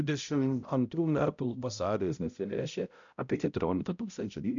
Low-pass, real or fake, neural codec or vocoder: 7.2 kHz; fake; codec, 16 kHz, 0.5 kbps, X-Codec, HuBERT features, trained on balanced general audio